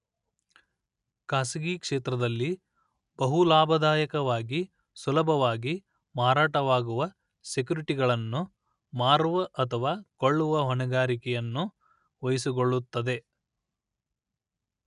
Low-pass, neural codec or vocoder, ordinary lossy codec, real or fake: 10.8 kHz; none; none; real